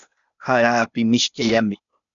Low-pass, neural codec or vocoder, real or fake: 7.2 kHz; codec, 16 kHz, 0.8 kbps, ZipCodec; fake